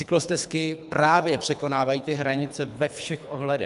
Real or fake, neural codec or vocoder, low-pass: fake; codec, 24 kHz, 3 kbps, HILCodec; 10.8 kHz